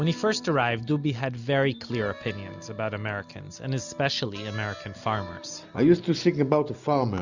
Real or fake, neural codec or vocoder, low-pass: real; none; 7.2 kHz